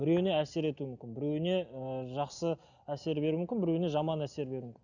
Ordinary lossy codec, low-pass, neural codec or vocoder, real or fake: none; 7.2 kHz; none; real